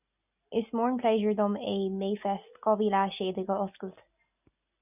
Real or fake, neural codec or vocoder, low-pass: real; none; 3.6 kHz